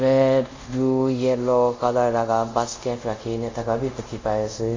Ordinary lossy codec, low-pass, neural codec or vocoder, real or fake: MP3, 48 kbps; 7.2 kHz; codec, 24 kHz, 0.5 kbps, DualCodec; fake